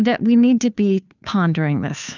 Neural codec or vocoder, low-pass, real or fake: codec, 16 kHz, 2 kbps, FunCodec, trained on Chinese and English, 25 frames a second; 7.2 kHz; fake